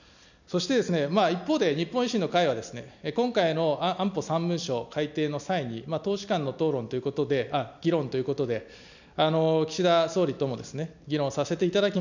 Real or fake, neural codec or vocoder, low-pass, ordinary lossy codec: real; none; 7.2 kHz; none